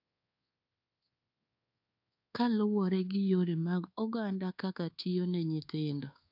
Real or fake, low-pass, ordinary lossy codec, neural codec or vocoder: fake; 5.4 kHz; AAC, 48 kbps; codec, 24 kHz, 1.2 kbps, DualCodec